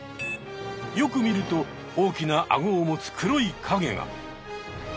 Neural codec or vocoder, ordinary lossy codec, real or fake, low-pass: none; none; real; none